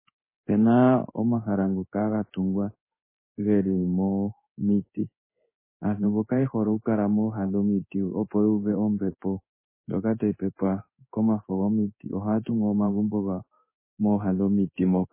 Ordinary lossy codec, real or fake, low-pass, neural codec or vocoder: MP3, 16 kbps; fake; 3.6 kHz; codec, 16 kHz in and 24 kHz out, 1 kbps, XY-Tokenizer